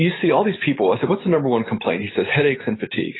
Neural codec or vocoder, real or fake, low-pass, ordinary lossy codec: none; real; 7.2 kHz; AAC, 16 kbps